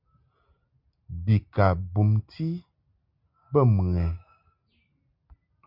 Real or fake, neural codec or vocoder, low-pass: real; none; 5.4 kHz